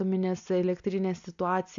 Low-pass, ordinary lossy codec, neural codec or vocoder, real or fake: 7.2 kHz; AAC, 64 kbps; none; real